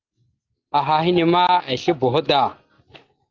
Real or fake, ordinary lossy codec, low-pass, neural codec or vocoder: real; Opus, 32 kbps; 7.2 kHz; none